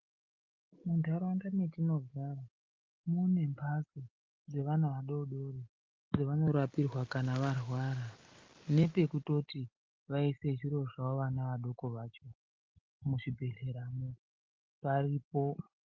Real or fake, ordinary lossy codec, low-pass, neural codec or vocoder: real; Opus, 32 kbps; 7.2 kHz; none